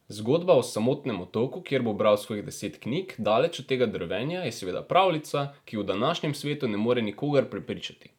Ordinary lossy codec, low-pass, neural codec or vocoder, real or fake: none; 19.8 kHz; none; real